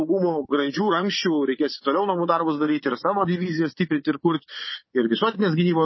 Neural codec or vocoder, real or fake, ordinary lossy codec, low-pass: vocoder, 24 kHz, 100 mel bands, Vocos; fake; MP3, 24 kbps; 7.2 kHz